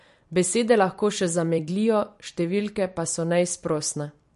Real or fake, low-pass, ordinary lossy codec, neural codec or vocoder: fake; 14.4 kHz; MP3, 48 kbps; vocoder, 44.1 kHz, 128 mel bands every 256 samples, BigVGAN v2